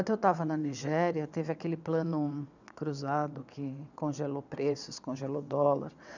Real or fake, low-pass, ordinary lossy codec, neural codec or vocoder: fake; 7.2 kHz; none; vocoder, 22.05 kHz, 80 mel bands, WaveNeXt